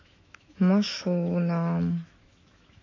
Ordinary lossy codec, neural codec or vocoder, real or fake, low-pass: AAC, 32 kbps; autoencoder, 48 kHz, 128 numbers a frame, DAC-VAE, trained on Japanese speech; fake; 7.2 kHz